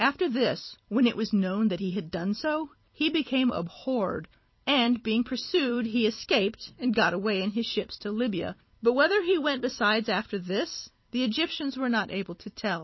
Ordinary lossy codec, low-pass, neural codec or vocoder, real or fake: MP3, 24 kbps; 7.2 kHz; vocoder, 44.1 kHz, 128 mel bands every 256 samples, BigVGAN v2; fake